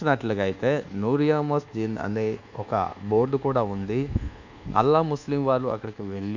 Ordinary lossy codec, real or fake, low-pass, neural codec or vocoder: none; fake; 7.2 kHz; codec, 24 kHz, 1.2 kbps, DualCodec